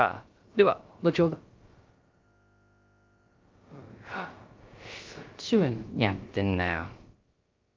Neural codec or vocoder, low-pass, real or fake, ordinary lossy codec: codec, 16 kHz, about 1 kbps, DyCAST, with the encoder's durations; 7.2 kHz; fake; Opus, 24 kbps